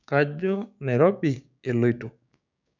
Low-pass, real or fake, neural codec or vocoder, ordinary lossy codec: 7.2 kHz; fake; codec, 16 kHz, 6 kbps, DAC; none